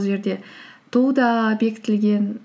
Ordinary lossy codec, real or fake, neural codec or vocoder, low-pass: none; real; none; none